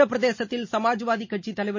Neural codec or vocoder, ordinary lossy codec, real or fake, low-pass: none; MP3, 32 kbps; real; 7.2 kHz